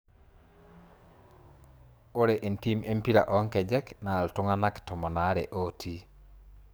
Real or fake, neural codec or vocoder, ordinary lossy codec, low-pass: fake; codec, 44.1 kHz, 7.8 kbps, DAC; none; none